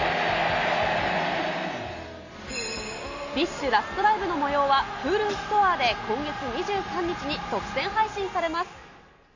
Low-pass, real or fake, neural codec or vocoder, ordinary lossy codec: 7.2 kHz; real; none; AAC, 48 kbps